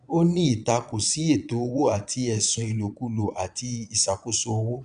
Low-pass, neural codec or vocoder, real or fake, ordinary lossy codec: 9.9 kHz; vocoder, 22.05 kHz, 80 mel bands, Vocos; fake; none